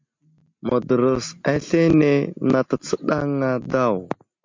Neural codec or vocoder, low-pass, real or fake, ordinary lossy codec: none; 7.2 kHz; real; MP3, 48 kbps